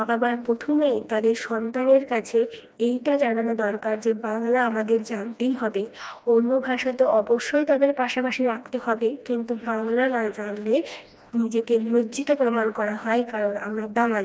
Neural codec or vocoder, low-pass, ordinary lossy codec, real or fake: codec, 16 kHz, 1 kbps, FreqCodec, smaller model; none; none; fake